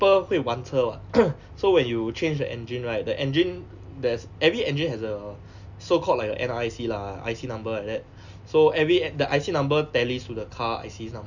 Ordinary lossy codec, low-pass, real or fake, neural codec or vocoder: none; 7.2 kHz; real; none